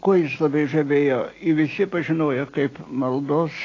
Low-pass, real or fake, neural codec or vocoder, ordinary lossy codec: 7.2 kHz; real; none; AAC, 32 kbps